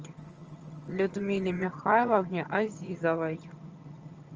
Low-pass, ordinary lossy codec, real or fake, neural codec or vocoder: 7.2 kHz; Opus, 24 kbps; fake; vocoder, 22.05 kHz, 80 mel bands, HiFi-GAN